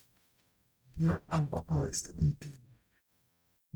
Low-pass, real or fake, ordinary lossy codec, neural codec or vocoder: none; fake; none; codec, 44.1 kHz, 0.9 kbps, DAC